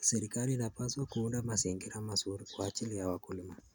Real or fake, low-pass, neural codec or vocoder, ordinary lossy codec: real; none; none; none